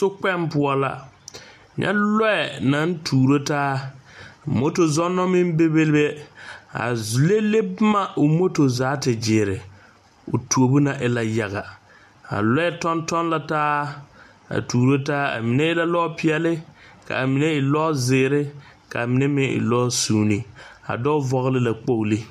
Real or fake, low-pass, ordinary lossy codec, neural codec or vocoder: real; 14.4 kHz; MP3, 96 kbps; none